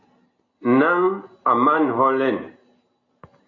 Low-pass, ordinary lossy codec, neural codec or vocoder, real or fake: 7.2 kHz; AAC, 32 kbps; none; real